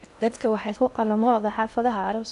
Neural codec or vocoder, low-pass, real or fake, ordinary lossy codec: codec, 16 kHz in and 24 kHz out, 0.6 kbps, FocalCodec, streaming, 2048 codes; 10.8 kHz; fake; none